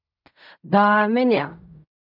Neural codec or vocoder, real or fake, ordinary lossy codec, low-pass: codec, 16 kHz in and 24 kHz out, 0.4 kbps, LongCat-Audio-Codec, fine tuned four codebook decoder; fake; MP3, 48 kbps; 5.4 kHz